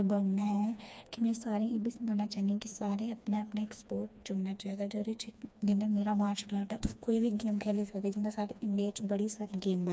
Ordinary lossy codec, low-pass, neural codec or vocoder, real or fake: none; none; codec, 16 kHz, 2 kbps, FreqCodec, smaller model; fake